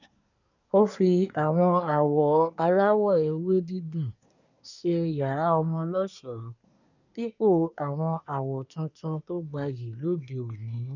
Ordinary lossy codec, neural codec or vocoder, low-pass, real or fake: none; codec, 24 kHz, 1 kbps, SNAC; 7.2 kHz; fake